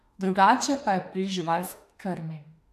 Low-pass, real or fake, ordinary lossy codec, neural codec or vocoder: 14.4 kHz; fake; AAC, 64 kbps; autoencoder, 48 kHz, 32 numbers a frame, DAC-VAE, trained on Japanese speech